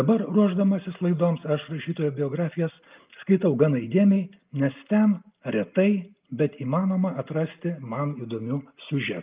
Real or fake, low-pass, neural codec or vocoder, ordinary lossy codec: real; 3.6 kHz; none; Opus, 24 kbps